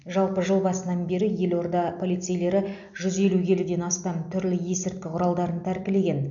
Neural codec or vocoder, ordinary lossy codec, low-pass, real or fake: none; none; 7.2 kHz; real